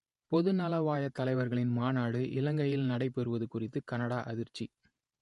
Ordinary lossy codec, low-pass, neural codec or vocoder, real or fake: MP3, 48 kbps; 14.4 kHz; vocoder, 48 kHz, 128 mel bands, Vocos; fake